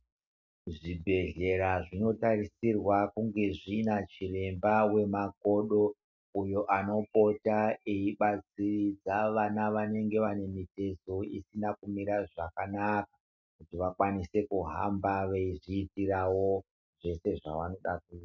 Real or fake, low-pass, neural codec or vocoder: real; 7.2 kHz; none